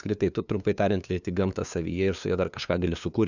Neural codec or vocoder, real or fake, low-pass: codec, 16 kHz, 2 kbps, FunCodec, trained on LibriTTS, 25 frames a second; fake; 7.2 kHz